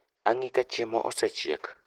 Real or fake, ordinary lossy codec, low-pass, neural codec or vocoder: real; Opus, 16 kbps; 19.8 kHz; none